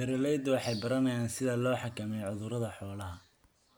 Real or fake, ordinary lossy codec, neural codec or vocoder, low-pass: real; none; none; none